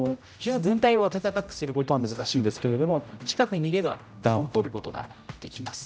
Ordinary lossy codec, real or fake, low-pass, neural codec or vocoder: none; fake; none; codec, 16 kHz, 0.5 kbps, X-Codec, HuBERT features, trained on general audio